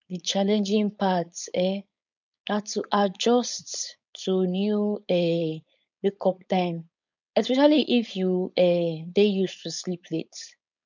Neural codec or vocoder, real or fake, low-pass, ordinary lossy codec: codec, 16 kHz, 4.8 kbps, FACodec; fake; 7.2 kHz; none